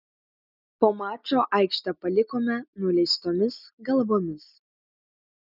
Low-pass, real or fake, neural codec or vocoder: 5.4 kHz; real; none